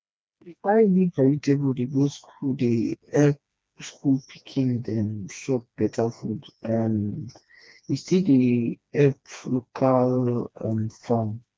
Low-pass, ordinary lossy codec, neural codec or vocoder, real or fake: none; none; codec, 16 kHz, 2 kbps, FreqCodec, smaller model; fake